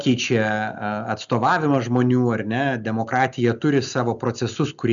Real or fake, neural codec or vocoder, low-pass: real; none; 7.2 kHz